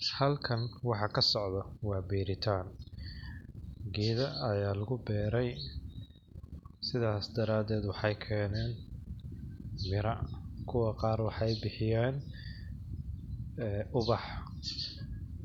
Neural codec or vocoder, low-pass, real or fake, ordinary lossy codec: none; 19.8 kHz; real; none